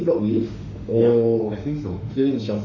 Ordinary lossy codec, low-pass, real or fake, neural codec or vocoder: none; 7.2 kHz; fake; autoencoder, 48 kHz, 32 numbers a frame, DAC-VAE, trained on Japanese speech